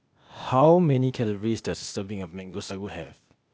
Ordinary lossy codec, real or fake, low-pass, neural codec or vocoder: none; fake; none; codec, 16 kHz, 0.8 kbps, ZipCodec